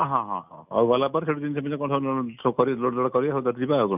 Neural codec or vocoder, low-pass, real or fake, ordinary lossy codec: none; 3.6 kHz; real; none